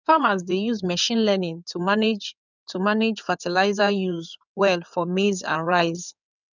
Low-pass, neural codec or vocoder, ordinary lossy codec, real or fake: 7.2 kHz; codec, 16 kHz in and 24 kHz out, 2.2 kbps, FireRedTTS-2 codec; none; fake